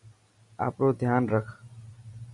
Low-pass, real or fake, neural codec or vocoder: 10.8 kHz; real; none